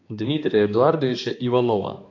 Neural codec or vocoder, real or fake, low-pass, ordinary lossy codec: codec, 16 kHz, 2 kbps, X-Codec, HuBERT features, trained on balanced general audio; fake; 7.2 kHz; AAC, 48 kbps